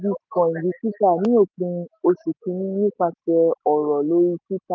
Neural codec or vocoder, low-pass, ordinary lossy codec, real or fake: none; 7.2 kHz; none; real